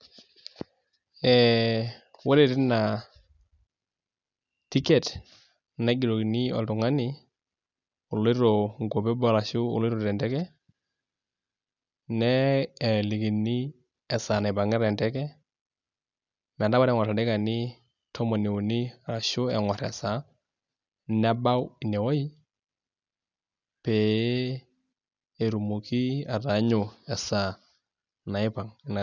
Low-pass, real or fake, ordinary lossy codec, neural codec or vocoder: 7.2 kHz; real; none; none